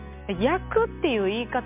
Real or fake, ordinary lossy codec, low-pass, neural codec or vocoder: real; MP3, 32 kbps; 3.6 kHz; none